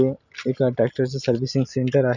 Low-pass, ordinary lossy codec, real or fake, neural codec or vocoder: 7.2 kHz; none; real; none